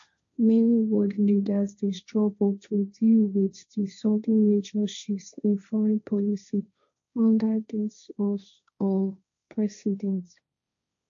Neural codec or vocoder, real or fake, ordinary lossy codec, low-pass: codec, 16 kHz, 1.1 kbps, Voila-Tokenizer; fake; AAC, 64 kbps; 7.2 kHz